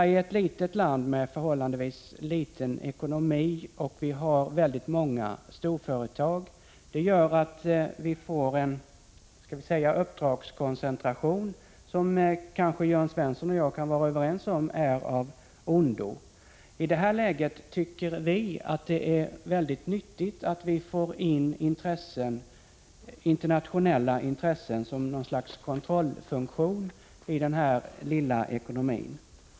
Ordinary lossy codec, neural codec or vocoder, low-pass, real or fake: none; none; none; real